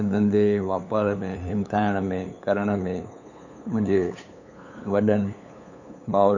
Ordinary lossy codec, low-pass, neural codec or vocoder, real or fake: none; 7.2 kHz; codec, 16 kHz, 8 kbps, FunCodec, trained on LibriTTS, 25 frames a second; fake